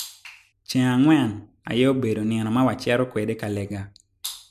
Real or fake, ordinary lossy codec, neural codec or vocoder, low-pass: real; MP3, 96 kbps; none; 14.4 kHz